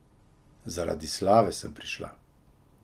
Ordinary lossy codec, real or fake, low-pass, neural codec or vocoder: Opus, 24 kbps; real; 14.4 kHz; none